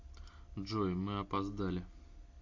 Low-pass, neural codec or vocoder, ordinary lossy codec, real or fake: 7.2 kHz; none; AAC, 48 kbps; real